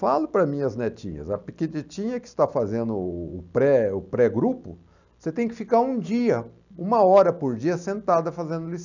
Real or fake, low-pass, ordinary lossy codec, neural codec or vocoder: real; 7.2 kHz; none; none